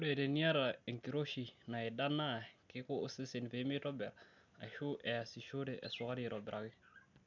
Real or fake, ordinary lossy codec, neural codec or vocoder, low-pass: real; none; none; 7.2 kHz